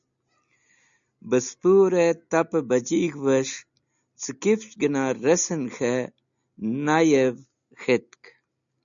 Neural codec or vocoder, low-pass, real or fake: none; 7.2 kHz; real